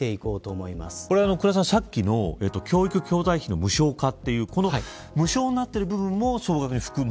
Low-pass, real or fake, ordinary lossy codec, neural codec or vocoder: none; real; none; none